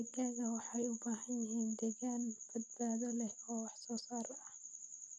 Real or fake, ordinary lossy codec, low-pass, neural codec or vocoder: real; none; 9.9 kHz; none